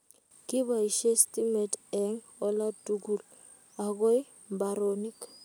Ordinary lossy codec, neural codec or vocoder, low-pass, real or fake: none; none; none; real